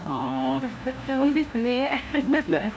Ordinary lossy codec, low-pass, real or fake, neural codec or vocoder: none; none; fake; codec, 16 kHz, 1 kbps, FunCodec, trained on LibriTTS, 50 frames a second